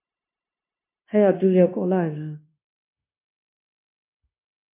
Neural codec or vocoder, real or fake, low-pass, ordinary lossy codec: codec, 16 kHz, 0.9 kbps, LongCat-Audio-Codec; fake; 3.6 kHz; MP3, 24 kbps